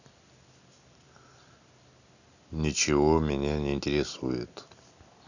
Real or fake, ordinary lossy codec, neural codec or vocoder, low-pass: real; none; none; 7.2 kHz